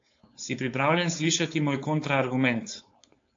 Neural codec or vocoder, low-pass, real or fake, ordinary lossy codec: codec, 16 kHz, 4.8 kbps, FACodec; 7.2 kHz; fake; AAC, 48 kbps